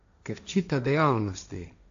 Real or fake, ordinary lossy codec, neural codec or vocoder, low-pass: fake; AAC, 64 kbps; codec, 16 kHz, 1.1 kbps, Voila-Tokenizer; 7.2 kHz